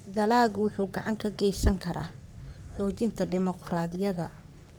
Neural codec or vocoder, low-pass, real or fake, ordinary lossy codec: codec, 44.1 kHz, 3.4 kbps, Pupu-Codec; none; fake; none